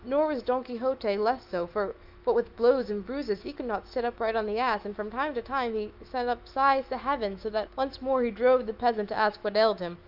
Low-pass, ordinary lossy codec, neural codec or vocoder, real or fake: 5.4 kHz; Opus, 32 kbps; autoencoder, 48 kHz, 128 numbers a frame, DAC-VAE, trained on Japanese speech; fake